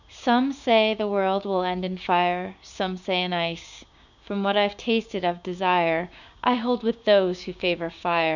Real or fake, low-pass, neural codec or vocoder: fake; 7.2 kHz; codec, 16 kHz, 6 kbps, DAC